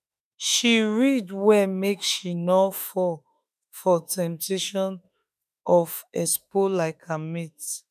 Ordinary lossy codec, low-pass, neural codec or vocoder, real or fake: none; 14.4 kHz; autoencoder, 48 kHz, 32 numbers a frame, DAC-VAE, trained on Japanese speech; fake